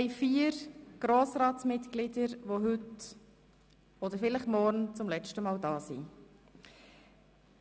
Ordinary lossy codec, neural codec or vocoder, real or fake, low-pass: none; none; real; none